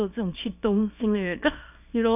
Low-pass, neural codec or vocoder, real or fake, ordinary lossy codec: 3.6 kHz; codec, 24 kHz, 0.9 kbps, WavTokenizer, small release; fake; none